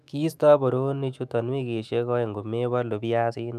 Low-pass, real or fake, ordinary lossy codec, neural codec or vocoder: 14.4 kHz; fake; none; autoencoder, 48 kHz, 128 numbers a frame, DAC-VAE, trained on Japanese speech